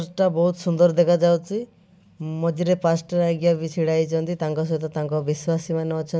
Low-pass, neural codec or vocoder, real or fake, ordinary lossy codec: none; none; real; none